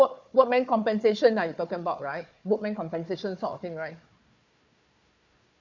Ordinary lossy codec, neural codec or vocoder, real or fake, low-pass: MP3, 64 kbps; codec, 16 kHz, 4 kbps, FunCodec, trained on Chinese and English, 50 frames a second; fake; 7.2 kHz